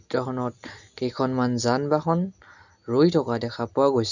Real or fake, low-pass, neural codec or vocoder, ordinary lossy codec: real; 7.2 kHz; none; none